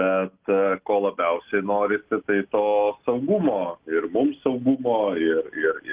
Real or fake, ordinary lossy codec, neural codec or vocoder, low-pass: real; Opus, 32 kbps; none; 3.6 kHz